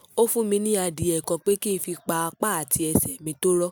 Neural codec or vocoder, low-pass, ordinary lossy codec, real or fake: none; none; none; real